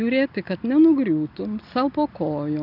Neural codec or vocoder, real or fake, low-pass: none; real; 5.4 kHz